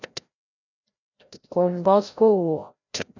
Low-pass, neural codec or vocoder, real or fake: 7.2 kHz; codec, 16 kHz, 0.5 kbps, FreqCodec, larger model; fake